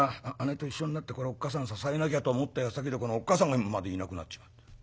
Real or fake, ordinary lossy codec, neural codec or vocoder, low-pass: real; none; none; none